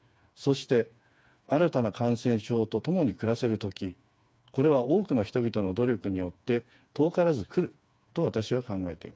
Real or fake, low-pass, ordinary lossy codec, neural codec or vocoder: fake; none; none; codec, 16 kHz, 4 kbps, FreqCodec, smaller model